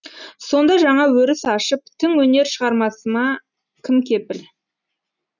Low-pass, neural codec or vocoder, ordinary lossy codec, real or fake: 7.2 kHz; none; none; real